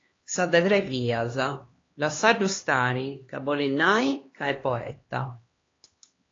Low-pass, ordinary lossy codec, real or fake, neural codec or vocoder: 7.2 kHz; AAC, 32 kbps; fake; codec, 16 kHz, 2 kbps, X-Codec, HuBERT features, trained on LibriSpeech